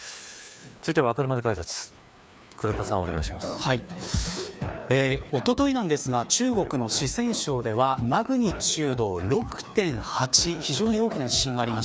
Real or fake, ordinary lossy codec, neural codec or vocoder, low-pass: fake; none; codec, 16 kHz, 2 kbps, FreqCodec, larger model; none